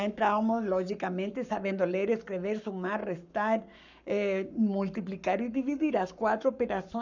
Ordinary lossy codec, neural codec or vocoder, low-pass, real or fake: none; codec, 44.1 kHz, 7.8 kbps, Pupu-Codec; 7.2 kHz; fake